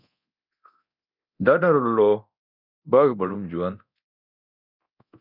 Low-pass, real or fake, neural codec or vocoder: 5.4 kHz; fake; codec, 24 kHz, 0.9 kbps, DualCodec